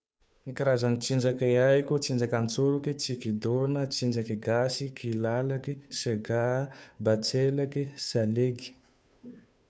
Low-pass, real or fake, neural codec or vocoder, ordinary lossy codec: none; fake; codec, 16 kHz, 2 kbps, FunCodec, trained on Chinese and English, 25 frames a second; none